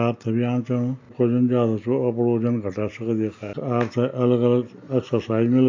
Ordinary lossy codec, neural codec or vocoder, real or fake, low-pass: MP3, 48 kbps; none; real; 7.2 kHz